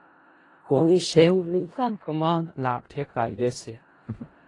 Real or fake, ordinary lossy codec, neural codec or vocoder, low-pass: fake; AAC, 32 kbps; codec, 16 kHz in and 24 kHz out, 0.4 kbps, LongCat-Audio-Codec, four codebook decoder; 10.8 kHz